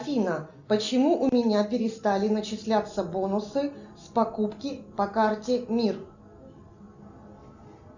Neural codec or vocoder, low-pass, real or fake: none; 7.2 kHz; real